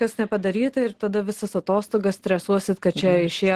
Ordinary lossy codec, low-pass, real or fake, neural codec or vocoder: Opus, 16 kbps; 14.4 kHz; real; none